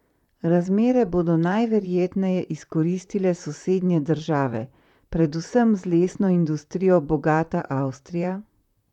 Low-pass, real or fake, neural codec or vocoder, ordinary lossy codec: 19.8 kHz; fake; vocoder, 44.1 kHz, 128 mel bands, Pupu-Vocoder; none